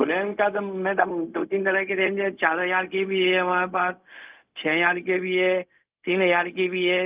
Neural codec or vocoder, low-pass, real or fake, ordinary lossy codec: codec, 16 kHz, 0.4 kbps, LongCat-Audio-Codec; 3.6 kHz; fake; Opus, 24 kbps